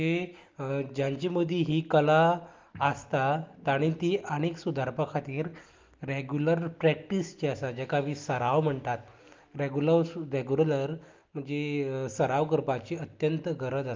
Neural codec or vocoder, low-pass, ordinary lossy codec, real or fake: none; 7.2 kHz; Opus, 32 kbps; real